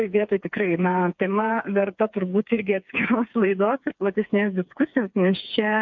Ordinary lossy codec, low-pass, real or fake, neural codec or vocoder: MP3, 64 kbps; 7.2 kHz; fake; codec, 16 kHz, 4 kbps, FreqCodec, smaller model